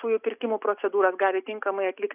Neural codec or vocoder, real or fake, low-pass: none; real; 3.6 kHz